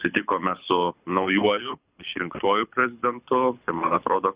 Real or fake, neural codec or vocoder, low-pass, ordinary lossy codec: fake; codec, 24 kHz, 6 kbps, HILCodec; 3.6 kHz; Opus, 64 kbps